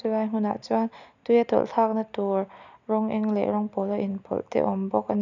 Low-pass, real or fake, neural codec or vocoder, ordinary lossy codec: 7.2 kHz; real; none; none